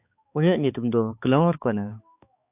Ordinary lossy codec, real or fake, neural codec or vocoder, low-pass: AAC, 32 kbps; fake; codec, 16 kHz, 4 kbps, X-Codec, HuBERT features, trained on balanced general audio; 3.6 kHz